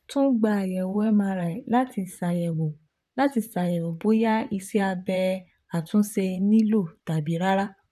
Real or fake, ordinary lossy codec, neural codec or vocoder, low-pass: fake; none; vocoder, 44.1 kHz, 128 mel bands, Pupu-Vocoder; 14.4 kHz